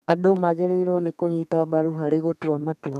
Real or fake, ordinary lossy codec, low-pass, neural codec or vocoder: fake; none; 14.4 kHz; codec, 32 kHz, 1.9 kbps, SNAC